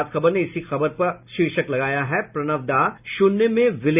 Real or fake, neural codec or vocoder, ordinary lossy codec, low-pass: real; none; none; 3.6 kHz